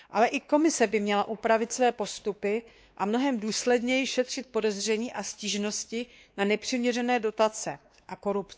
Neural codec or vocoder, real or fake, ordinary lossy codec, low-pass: codec, 16 kHz, 2 kbps, X-Codec, WavLM features, trained on Multilingual LibriSpeech; fake; none; none